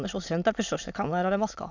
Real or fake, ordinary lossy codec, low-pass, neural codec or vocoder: fake; none; 7.2 kHz; autoencoder, 22.05 kHz, a latent of 192 numbers a frame, VITS, trained on many speakers